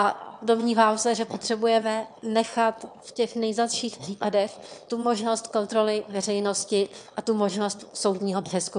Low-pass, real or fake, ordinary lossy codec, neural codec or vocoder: 9.9 kHz; fake; MP3, 96 kbps; autoencoder, 22.05 kHz, a latent of 192 numbers a frame, VITS, trained on one speaker